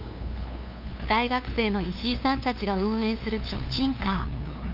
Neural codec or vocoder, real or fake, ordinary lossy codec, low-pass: codec, 16 kHz, 2 kbps, FunCodec, trained on LibriTTS, 25 frames a second; fake; none; 5.4 kHz